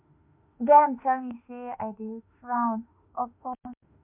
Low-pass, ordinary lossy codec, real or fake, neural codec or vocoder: 3.6 kHz; MP3, 32 kbps; fake; autoencoder, 48 kHz, 32 numbers a frame, DAC-VAE, trained on Japanese speech